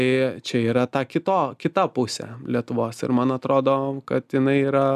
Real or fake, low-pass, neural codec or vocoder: real; 14.4 kHz; none